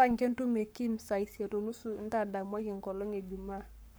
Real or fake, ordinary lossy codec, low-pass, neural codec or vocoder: fake; none; none; codec, 44.1 kHz, 7.8 kbps, Pupu-Codec